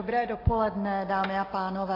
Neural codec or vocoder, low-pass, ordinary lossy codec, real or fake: none; 5.4 kHz; AAC, 24 kbps; real